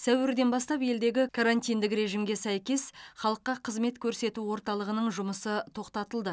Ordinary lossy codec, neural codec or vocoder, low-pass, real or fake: none; none; none; real